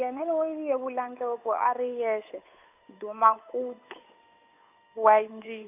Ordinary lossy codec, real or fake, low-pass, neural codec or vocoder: none; fake; 3.6 kHz; codec, 16 kHz, 8 kbps, FunCodec, trained on Chinese and English, 25 frames a second